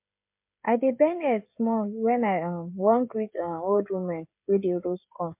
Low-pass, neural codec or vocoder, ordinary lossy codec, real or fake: 3.6 kHz; codec, 16 kHz, 16 kbps, FreqCodec, smaller model; MP3, 32 kbps; fake